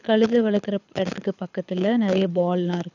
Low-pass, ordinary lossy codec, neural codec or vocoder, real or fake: 7.2 kHz; none; codec, 24 kHz, 6 kbps, HILCodec; fake